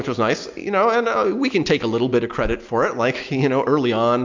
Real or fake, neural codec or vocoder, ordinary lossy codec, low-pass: real; none; MP3, 64 kbps; 7.2 kHz